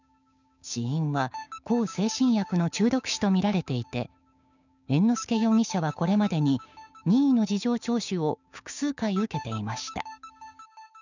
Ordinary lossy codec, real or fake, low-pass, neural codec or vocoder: none; fake; 7.2 kHz; codec, 16 kHz, 6 kbps, DAC